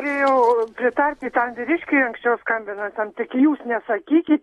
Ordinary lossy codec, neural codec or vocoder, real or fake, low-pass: AAC, 32 kbps; none; real; 19.8 kHz